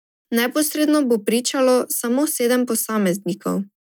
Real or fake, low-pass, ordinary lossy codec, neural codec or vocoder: real; none; none; none